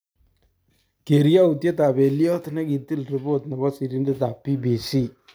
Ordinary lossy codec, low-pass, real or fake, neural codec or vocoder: none; none; real; none